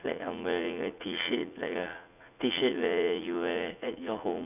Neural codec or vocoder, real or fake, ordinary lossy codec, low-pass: vocoder, 44.1 kHz, 80 mel bands, Vocos; fake; none; 3.6 kHz